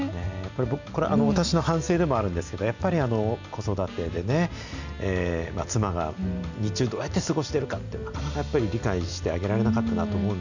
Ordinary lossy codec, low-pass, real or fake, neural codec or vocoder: none; 7.2 kHz; real; none